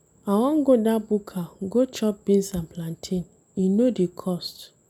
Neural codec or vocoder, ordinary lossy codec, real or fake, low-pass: none; none; real; 19.8 kHz